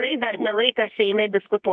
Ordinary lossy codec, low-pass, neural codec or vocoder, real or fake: MP3, 64 kbps; 10.8 kHz; codec, 24 kHz, 0.9 kbps, WavTokenizer, medium music audio release; fake